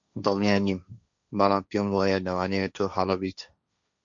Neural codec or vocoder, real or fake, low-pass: codec, 16 kHz, 1.1 kbps, Voila-Tokenizer; fake; 7.2 kHz